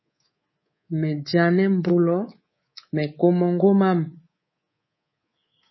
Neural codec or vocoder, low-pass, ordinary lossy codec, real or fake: codec, 24 kHz, 3.1 kbps, DualCodec; 7.2 kHz; MP3, 24 kbps; fake